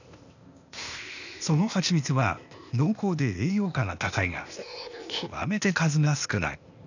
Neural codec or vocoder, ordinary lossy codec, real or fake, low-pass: codec, 16 kHz, 0.8 kbps, ZipCodec; none; fake; 7.2 kHz